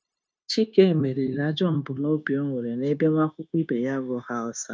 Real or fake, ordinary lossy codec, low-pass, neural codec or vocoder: fake; none; none; codec, 16 kHz, 0.9 kbps, LongCat-Audio-Codec